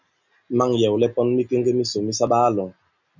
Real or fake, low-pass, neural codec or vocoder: real; 7.2 kHz; none